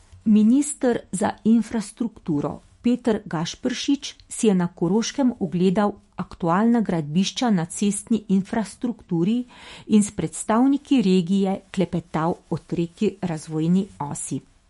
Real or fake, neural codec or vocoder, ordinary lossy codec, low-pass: fake; autoencoder, 48 kHz, 128 numbers a frame, DAC-VAE, trained on Japanese speech; MP3, 48 kbps; 19.8 kHz